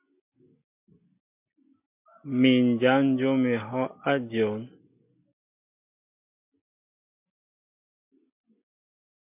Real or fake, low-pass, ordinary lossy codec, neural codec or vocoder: real; 3.6 kHz; MP3, 32 kbps; none